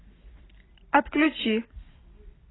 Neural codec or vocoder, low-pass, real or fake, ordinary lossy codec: none; 7.2 kHz; real; AAC, 16 kbps